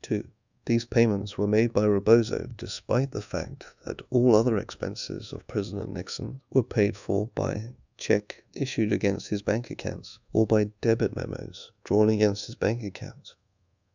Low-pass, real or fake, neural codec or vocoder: 7.2 kHz; fake; codec, 24 kHz, 1.2 kbps, DualCodec